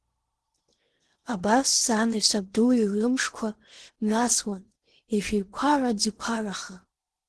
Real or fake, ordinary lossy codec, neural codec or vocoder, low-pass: fake; Opus, 16 kbps; codec, 16 kHz in and 24 kHz out, 0.8 kbps, FocalCodec, streaming, 65536 codes; 10.8 kHz